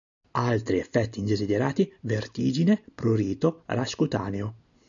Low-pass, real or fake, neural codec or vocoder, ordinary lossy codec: 7.2 kHz; real; none; MP3, 96 kbps